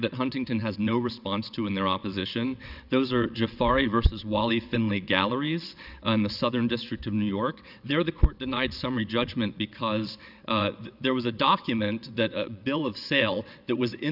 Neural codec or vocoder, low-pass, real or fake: vocoder, 22.05 kHz, 80 mel bands, WaveNeXt; 5.4 kHz; fake